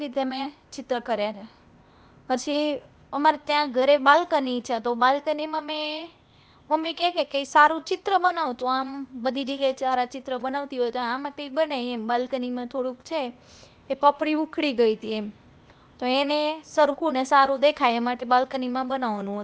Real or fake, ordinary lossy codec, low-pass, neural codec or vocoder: fake; none; none; codec, 16 kHz, 0.8 kbps, ZipCodec